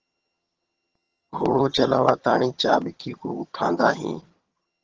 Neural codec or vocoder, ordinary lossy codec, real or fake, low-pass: vocoder, 22.05 kHz, 80 mel bands, HiFi-GAN; Opus, 16 kbps; fake; 7.2 kHz